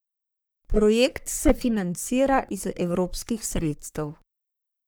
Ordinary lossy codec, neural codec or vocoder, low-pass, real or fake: none; codec, 44.1 kHz, 3.4 kbps, Pupu-Codec; none; fake